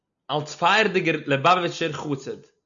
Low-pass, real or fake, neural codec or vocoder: 7.2 kHz; real; none